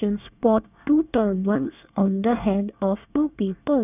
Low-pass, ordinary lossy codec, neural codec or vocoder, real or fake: 3.6 kHz; none; codec, 24 kHz, 1 kbps, SNAC; fake